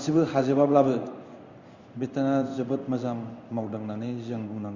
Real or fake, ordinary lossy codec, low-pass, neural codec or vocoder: fake; Opus, 64 kbps; 7.2 kHz; codec, 16 kHz in and 24 kHz out, 1 kbps, XY-Tokenizer